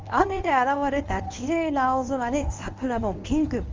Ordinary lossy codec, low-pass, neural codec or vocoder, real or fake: Opus, 32 kbps; 7.2 kHz; codec, 24 kHz, 0.9 kbps, WavTokenizer, medium speech release version 1; fake